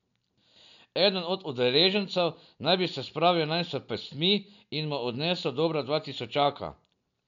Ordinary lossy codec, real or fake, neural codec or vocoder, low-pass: none; real; none; 7.2 kHz